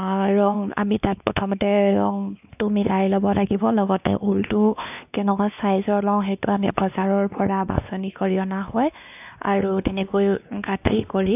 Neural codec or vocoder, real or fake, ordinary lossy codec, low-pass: codec, 16 kHz, 2 kbps, X-Codec, WavLM features, trained on Multilingual LibriSpeech; fake; none; 3.6 kHz